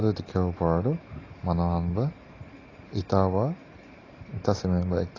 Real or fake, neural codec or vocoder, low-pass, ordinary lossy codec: real; none; 7.2 kHz; AAC, 32 kbps